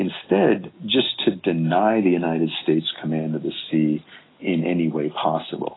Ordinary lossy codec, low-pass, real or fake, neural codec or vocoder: AAC, 16 kbps; 7.2 kHz; real; none